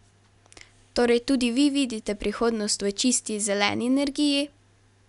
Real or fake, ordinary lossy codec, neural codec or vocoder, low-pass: real; none; none; 10.8 kHz